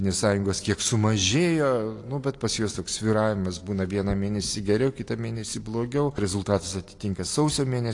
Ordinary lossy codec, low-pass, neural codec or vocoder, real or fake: AAC, 48 kbps; 10.8 kHz; none; real